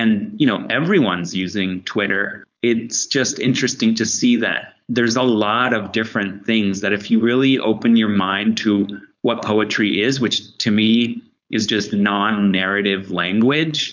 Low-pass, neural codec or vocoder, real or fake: 7.2 kHz; codec, 16 kHz, 4.8 kbps, FACodec; fake